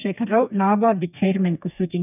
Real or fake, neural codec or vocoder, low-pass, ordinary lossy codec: fake; codec, 32 kHz, 1.9 kbps, SNAC; 3.6 kHz; none